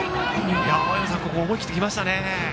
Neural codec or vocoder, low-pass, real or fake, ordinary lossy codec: none; none; real; none